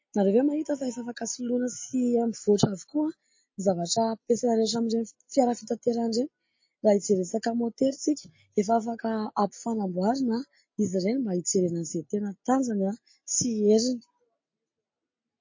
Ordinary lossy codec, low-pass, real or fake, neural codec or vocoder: MP3, 32 kbps; 7.2 kHz; real; none